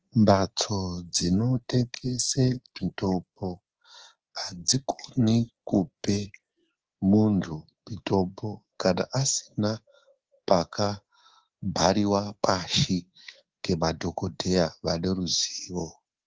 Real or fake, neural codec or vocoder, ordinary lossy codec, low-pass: fake; codec, 24 kHz, 3.1 kbps, DualCodec; Opus, 16 kbps; 7.2 kHz